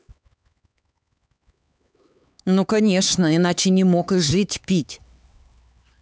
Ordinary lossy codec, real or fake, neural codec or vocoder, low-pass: none; fake; codec, 16 kHz, 4 kbps, X-Codec, HuBERT features, trained on LibriSpeech; none